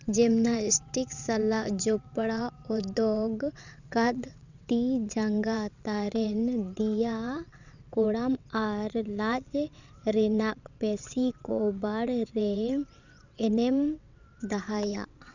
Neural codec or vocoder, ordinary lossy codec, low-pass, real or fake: vocoder, 22.05 kHz, 80 mel bands, WaveNeXt; none; 7.2 kHz; fake